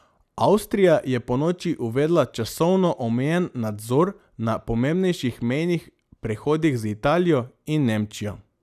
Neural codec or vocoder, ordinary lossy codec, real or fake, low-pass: none; none; real; 14.4 kHz